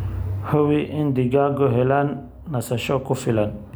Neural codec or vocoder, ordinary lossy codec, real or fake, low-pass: none; none; real; none